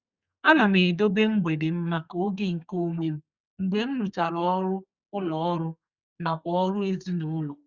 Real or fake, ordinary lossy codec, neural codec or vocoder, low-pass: fake; Opus, 64 kbps; codec, 32 kHz, 1.9 kbps, SNAC; 7.2 kHz